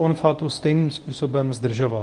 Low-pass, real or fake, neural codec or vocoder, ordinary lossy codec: 10.8 kHz; fake; codec, 24 kHz, 0.9 kbps, WavTokenizer, medium speech release version 1; Opus, 24 kbps